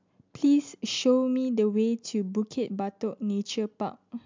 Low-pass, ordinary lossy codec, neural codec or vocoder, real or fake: 7.2 kHz; MP3, 64 kbps; none; real